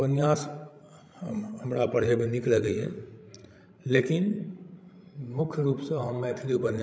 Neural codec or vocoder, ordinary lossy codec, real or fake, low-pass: codec, 16 kHz, 16 kbps, FreqCodec, larger model; none; fake; none